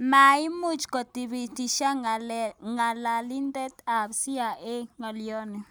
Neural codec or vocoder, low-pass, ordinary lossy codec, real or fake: none; none; none; real